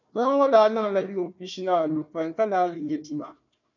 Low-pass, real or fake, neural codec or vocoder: 7.2 kHz; fake; codec, 16 kHz, 1 kbps, FunCodec, trained on Chinese and English, 50 frames a second